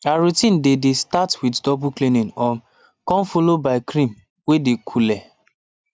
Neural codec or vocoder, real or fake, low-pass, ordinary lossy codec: none; real; none; none